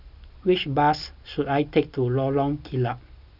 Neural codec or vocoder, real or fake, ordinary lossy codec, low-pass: none; real; none; 5.4 kHz